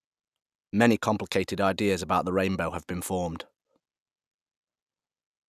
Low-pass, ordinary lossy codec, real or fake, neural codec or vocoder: 14.4 kHz; none; real; none